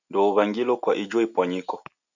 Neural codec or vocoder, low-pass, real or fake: none; 7.2 kHz; real